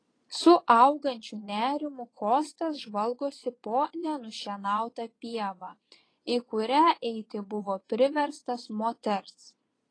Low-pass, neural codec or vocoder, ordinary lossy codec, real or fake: 9.9 kHz; vocoder, 22.05 kHz, 80 mel bands, Vocos; AAC, 32 kbps; fake